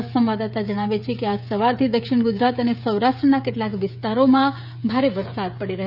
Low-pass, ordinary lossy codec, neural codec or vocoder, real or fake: 5.4 kHz; none; codec, 16 kHz, 16 kbps, FreqCodec, smaller model; fake